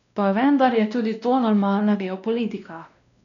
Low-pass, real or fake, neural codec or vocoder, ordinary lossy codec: 7.2 kHz; fake; codec, 16 kHz, 1 kbps, X-Codec, WavLM features, trained on Multilingual LibriSpeech; none